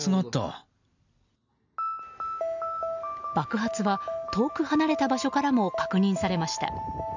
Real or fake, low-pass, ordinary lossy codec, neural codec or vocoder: real; 7.2 kHz; none; none